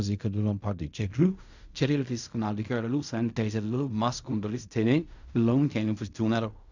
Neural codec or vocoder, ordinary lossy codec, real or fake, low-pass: codec, 16 kHz in and 24 kHz out, 0.4 kbps, LongCat-Audio-Codec, fine tuned four codebook decoder; none; fake; 7.2 kHz